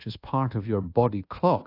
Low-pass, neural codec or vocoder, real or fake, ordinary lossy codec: 5.4 kHz; codec, 24 kHz, 1.2 kbps, DualCodec; fake; AAC, 32 kbps